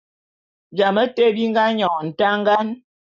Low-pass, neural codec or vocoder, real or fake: 7.2 kHz; none; real